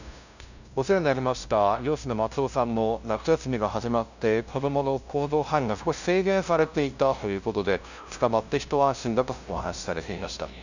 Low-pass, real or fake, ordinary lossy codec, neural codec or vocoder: 7.2 kHz; fake; none; codec, 16 kHz, 0.5 kbps, FunCodec, trained on LibriTTS, 25 frames a second